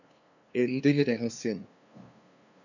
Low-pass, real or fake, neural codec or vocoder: 7.2 kHz; fake; codec, 16 kHz, 2 kbps, FunCodec, trained on LibriTTS, 25 frames a second